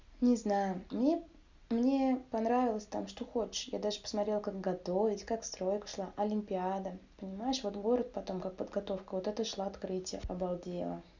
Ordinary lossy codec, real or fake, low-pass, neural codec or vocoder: Opus, 64 kbps; real; 7.2 kHz; none